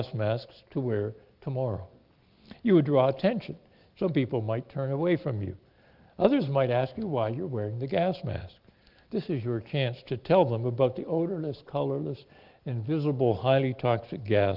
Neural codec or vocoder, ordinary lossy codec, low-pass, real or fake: none; Opus, 24 kbps; 5.4 kHz; real